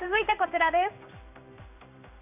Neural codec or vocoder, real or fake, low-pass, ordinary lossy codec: codec, 16 kHz in and 24 kHz out, 1 kbps, XY-Tokenizer; fake; 3.6 kHz; none